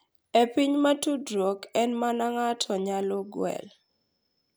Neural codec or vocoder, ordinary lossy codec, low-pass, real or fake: none; none; none; real